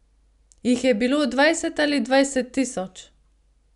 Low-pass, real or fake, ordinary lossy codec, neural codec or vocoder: 10.8 kHz; real; none; none